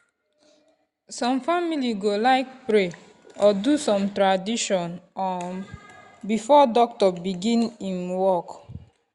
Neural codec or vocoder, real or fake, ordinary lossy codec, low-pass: none; real; Opus, 64 kbps; 10.8 kHz